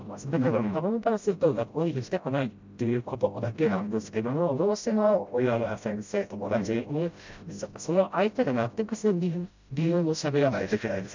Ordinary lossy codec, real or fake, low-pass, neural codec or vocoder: MP3, 64 kbps; fake; 7.2 kHz; codec, 16 kHz, 0.5 kbps, FreqCodec, smaller model